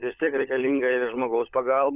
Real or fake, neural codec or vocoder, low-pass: fake; codec, 16 kHz, 16 kbps, FunCodec, trained on LibriTTS, 50 frames a second; 3.6 kHz